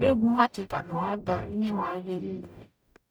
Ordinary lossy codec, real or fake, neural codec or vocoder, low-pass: none; fake; codec, 44.1 kHz, 0.9 kbps, DAC; none